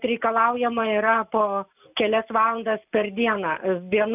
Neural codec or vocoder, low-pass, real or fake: none; 3.6 kHz; real